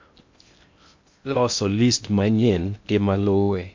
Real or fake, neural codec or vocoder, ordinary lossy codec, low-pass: fake; codec, 16 kHz in and 24 kHz out, 0.6 kbps, FocalCodec, streaming, 2048 codes; MP3, 64 kbps; 7.2 kHz